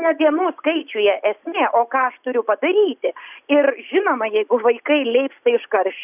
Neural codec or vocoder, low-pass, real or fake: none; 3.6 kHz; real